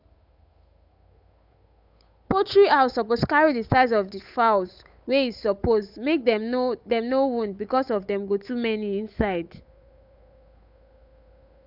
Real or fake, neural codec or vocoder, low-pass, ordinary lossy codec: fake; codec, 16 kHz, 8 kbps, FunCodec, trained on Chinese and English, 25 frames a second; 5.4 kHz; none